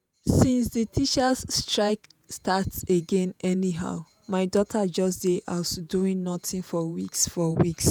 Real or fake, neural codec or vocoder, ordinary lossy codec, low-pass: fake; vocoder, 48 kHz, 128 mel bands, Vocos; none; none